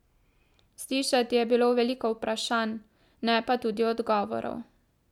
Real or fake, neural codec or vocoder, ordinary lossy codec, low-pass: real; none; none; 19.8 kHz